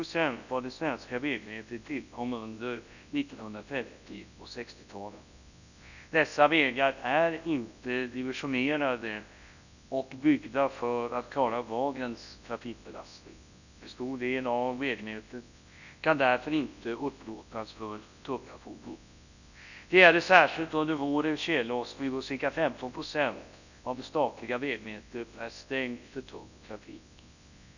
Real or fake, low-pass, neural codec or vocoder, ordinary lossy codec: fake; 7.2 kHz; codec, 24 kHz, 0.9 kbps, WavTokenizer, large speech release; none